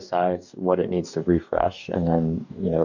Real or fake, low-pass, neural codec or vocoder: fake; 7.2 kHz; codec, 44.1 kHz, 2.6 kbps, DAC